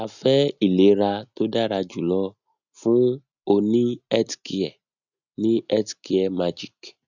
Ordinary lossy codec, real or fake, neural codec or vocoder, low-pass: none; real; none; 7.2 kHz